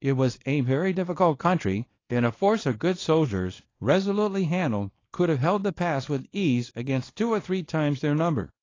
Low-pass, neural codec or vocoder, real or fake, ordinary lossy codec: 7.2 kHz; codec, 24 kHz, 0.9 kbps, WavTokenizer, small release; fake; AAC, 32 kbps